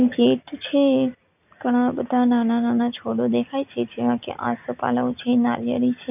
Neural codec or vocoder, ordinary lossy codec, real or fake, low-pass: none; none; real; 3.6 kHz